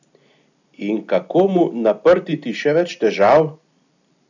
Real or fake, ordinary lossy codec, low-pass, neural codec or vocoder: real; none; 7.2 kHz; none